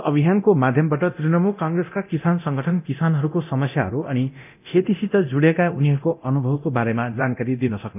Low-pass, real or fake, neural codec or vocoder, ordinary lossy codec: 3.6 kHz; fake; codec, 24 kHz, 0.9 kbps, DualCodec; none